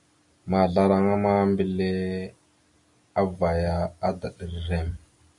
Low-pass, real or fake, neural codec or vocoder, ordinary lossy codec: 10.8 kHz; real; none; MP3, 48 kbps